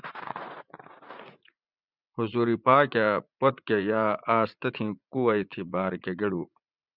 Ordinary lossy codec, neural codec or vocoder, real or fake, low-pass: Opus, 64 kbps; vocoder, 44.1 kHz, 80 mel bands, Vocos; fake; 5.4 kHz